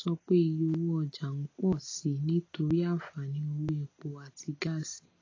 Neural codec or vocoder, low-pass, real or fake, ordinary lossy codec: none; 7.2 kHz; real; AAC, 32 kbps